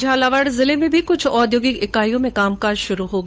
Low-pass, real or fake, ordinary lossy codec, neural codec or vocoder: none; fake; none; codec, 16 kHz, 8 kbps, FunCodec, trained on Chinese and English, 25 frames a second